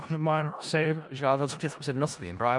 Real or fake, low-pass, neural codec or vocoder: fake; 10.8 kHz; codec, 16 kHz in and 24 kHz out, 0.4 kbps, LongCat-Audio-Codec, four codebook decoder